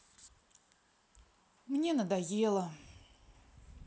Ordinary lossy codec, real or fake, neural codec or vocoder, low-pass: none; real; none; none